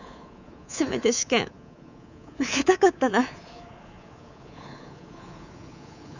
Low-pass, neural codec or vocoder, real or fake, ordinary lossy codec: 7.2 kHz; codec, 24 kHz, 3.1 kbps, DualCodec; fake; none